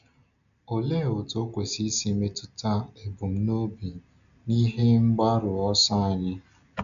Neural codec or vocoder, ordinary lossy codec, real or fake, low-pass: none; none; real; 7.2 kHz